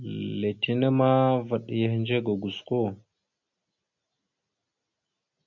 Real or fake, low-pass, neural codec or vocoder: real; 7.2 kHz; none